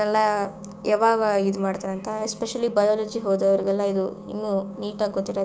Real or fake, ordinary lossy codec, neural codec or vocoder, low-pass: fake; none; codec, 16 kHz, 6 kbps, DAC; none